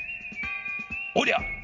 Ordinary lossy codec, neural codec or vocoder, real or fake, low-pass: Opus, 64 kbps; none; real; 7.2 kHz